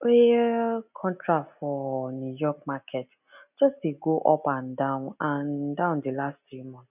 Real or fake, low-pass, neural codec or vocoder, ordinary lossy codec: real; 3.6 kHz; none; none